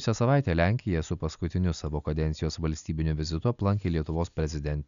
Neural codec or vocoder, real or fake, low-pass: none; real; 7.2 kHz